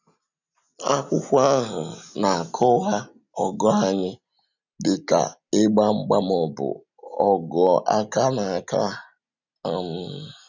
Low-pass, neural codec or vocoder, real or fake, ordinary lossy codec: 7.2 kHz; none; real; none